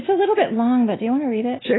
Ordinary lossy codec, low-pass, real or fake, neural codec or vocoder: AAC, 16 kbps; 7.2 kHz; real; none